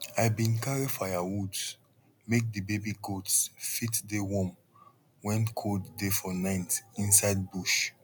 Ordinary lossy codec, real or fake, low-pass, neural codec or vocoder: none; real; none; none